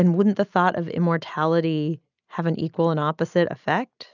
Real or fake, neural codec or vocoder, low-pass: real; none; 7.2 kHz